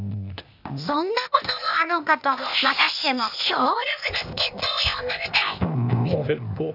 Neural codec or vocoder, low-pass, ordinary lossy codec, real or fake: codec, 16 kHz, 0.8 kbps, ZipCodec; 5.4 kHz; none; fake